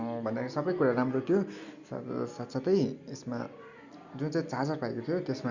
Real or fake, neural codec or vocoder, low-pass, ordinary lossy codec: real; none; 7.2 kHz; Opus, 64 kbps